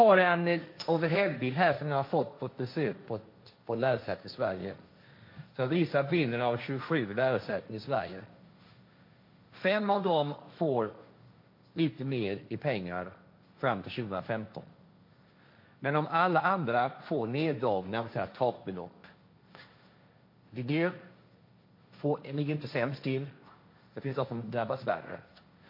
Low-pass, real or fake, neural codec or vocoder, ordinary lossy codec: 5.4 kHz; fake; codec, 16 kHz, 1.1 kbps, Voila-Tokenizer; MP3, 32 kbps